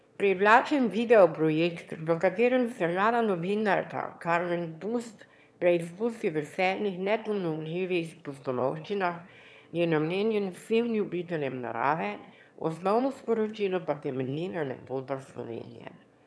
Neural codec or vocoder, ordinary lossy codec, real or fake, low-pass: autoencoder, 22.05 kHz, a latent of 192 numbers a frame, VITS, trained on one speaker; none; fake; none